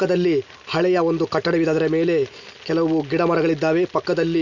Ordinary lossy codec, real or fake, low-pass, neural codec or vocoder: none; real; 7.2 kHz; none